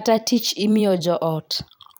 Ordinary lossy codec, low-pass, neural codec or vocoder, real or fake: none; none; vocoder, 44.1 kHz, 128 mel bands every 256 samples, BigVGAN v2; fake